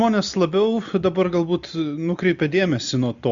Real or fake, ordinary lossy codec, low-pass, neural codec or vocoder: real; Opus, 64 kbps; 7.2 kHz; none